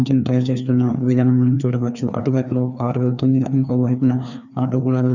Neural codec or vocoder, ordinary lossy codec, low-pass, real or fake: codec, 16 kHz, 2 kbps, FreqCodec, larger model; none; 7.2 kHz; fake